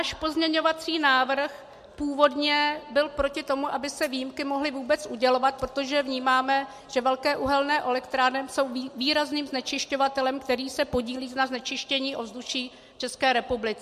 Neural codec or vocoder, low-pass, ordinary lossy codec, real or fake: none; 14.4 kHz; MP3, 64 kbps; real